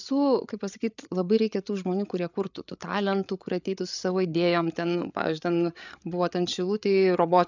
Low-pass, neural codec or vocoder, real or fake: 7.2 kHz; codec, 16 kHz, 8 kbps, FreqCodec, larger model; fake